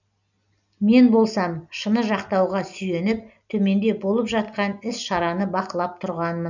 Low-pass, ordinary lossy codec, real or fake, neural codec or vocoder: 7.2 kHz; none; real; none